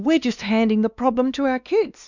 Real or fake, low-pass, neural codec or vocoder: fake; 7.2 kHz; codec, 16 kHz, 2 kbps, X-Codec, WavLM features, trained on Multilingual LibriSpeech